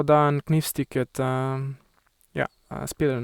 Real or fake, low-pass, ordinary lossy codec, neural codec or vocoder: real; 19.8 kHz; Opus, 64 kbps; none